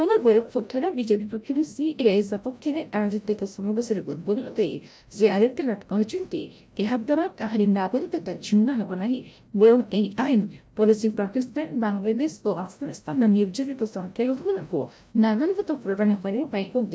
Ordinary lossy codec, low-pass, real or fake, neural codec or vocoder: none; none; fake; codec, 16 kHz, 0.5 kbps, FreqCodec, larger model